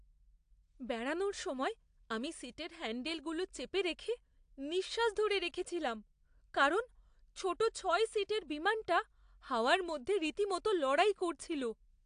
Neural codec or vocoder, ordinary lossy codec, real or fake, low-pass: none; AAC, 64 kbps; real; 9.9 kHz